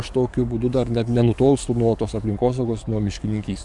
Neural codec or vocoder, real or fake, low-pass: codec, 44.1 kHz, 7.8 kbps, DAC; fake; 10.8 kHz